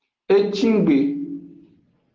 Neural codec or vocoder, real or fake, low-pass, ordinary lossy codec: none; real; 7.2 kHz; Opus, 16 kbps